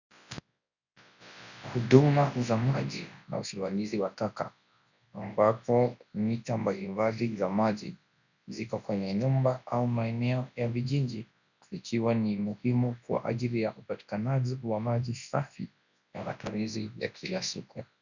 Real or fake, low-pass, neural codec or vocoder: fake; 7.2 kHz; codec, 24 kHz, 0.9 kbps, WavTokenizer, large speech release